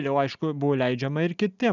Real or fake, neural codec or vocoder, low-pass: real; none; 7.2 kHz